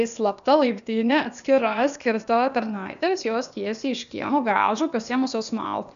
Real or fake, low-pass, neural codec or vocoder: fake; 7.2 kHz; codec, 16 kHz, 0.8 kbps, ZipCodec